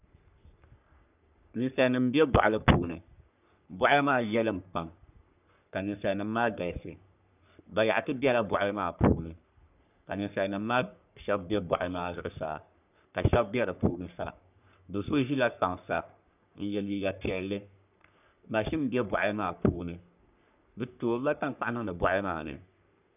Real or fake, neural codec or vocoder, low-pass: fake; codec, 44.1 kHz, 3.4 kbps, Pupu-Codec; 3.6 kHz